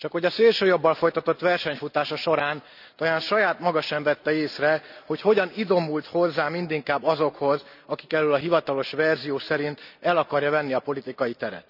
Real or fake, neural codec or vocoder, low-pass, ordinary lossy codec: real; none; 5.4 kHz; none